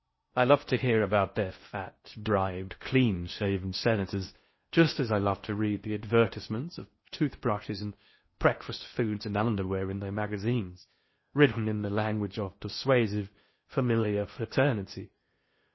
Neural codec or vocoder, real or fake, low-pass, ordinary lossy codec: codec, 16 kHz in and 24 kHz out, 0.6 kbps, FocalCodec, streaming, 4096 codes; fake; 7.2 kHz; MP3, 24 kbps